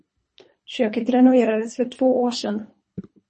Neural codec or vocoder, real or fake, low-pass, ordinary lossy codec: codec, 24 kHz, 3 kbps, HILCodec; fake; 10.8 kHz; MP3, 32 kbps